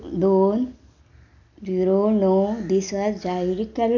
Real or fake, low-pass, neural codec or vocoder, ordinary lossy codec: real; 7.2 kHz; none; none